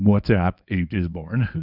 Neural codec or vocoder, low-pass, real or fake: codec, 24 kHz, 0.9 kbps, WavTokenizer, medium speech release version 1; 5.4 kHz; fake